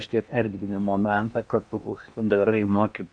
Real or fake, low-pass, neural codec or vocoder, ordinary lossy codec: fake; 9.9 kHz; codec, 16 kHz in and 24 kHz out, 0.8 kbps, FocalCodec, streaming, 65536 codes; MP3, 96 kbps